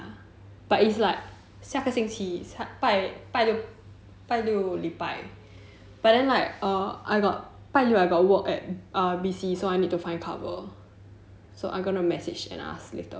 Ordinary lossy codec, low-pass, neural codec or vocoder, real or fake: none; none; none; real